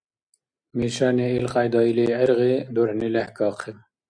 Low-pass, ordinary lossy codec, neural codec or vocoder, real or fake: 9.9 kHz; MP3, 96 kbps; none; real